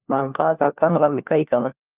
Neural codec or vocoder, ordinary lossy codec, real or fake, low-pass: codec, 16 kHz, 1 kbps, FunCodec, trained on LibriTTS, 50 frames a second; Opus, 32 kbps; fake; 3.6 kHz